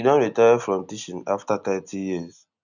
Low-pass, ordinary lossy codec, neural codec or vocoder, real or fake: 7.2 kHz; none; none; real